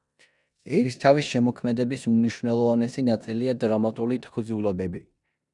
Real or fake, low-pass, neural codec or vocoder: fake; 10.8 kHz; codec, 16 kHz in and 24 kHz out, 0.9 kbps, LongCat-Audio-Codec, four codebook decoder